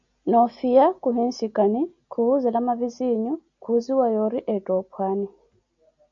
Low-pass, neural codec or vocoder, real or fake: 7.2 kHz; none; real